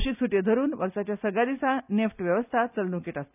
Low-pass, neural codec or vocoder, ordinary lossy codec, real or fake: 3.6 kHz; none; none; real